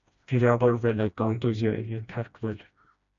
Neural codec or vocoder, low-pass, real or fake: codec, 16 kHz, 1 kbps, FreqCodec, smaller model; 7.2 kHz; fake